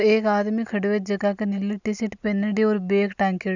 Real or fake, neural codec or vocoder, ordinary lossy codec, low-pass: real; none; none; 7.2 kHz